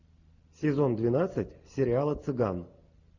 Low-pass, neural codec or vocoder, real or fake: 7.2 kHz; none; real